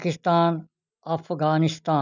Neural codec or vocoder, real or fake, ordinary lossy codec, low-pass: none; real; none; 7.2 kHz